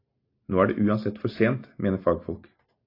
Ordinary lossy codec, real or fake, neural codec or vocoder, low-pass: AAC, 32 kbps; real; none; 5.4 kHz